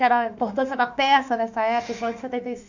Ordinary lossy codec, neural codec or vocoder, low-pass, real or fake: none; autoencoder, 48 kHz, 32 numbers a frame, DAC-VAE, trained on Japanese speech; 7.2 kHz; fake